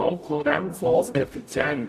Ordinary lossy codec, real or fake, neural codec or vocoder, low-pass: AAC, 64 kbps; fake; codec, 44.1 kHz, 0.9 kbps, DAC; 14.4 kHz